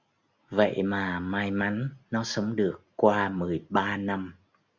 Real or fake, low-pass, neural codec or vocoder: real; 7.2 kHz; none